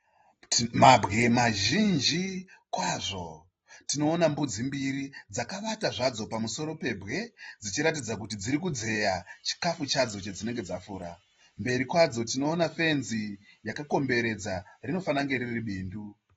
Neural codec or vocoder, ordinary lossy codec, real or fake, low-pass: none; AAC, 24 kbps; real; 19.8 kHz